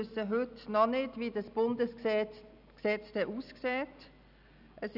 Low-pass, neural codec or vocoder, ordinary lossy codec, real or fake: 5.4 kHz; none; none; real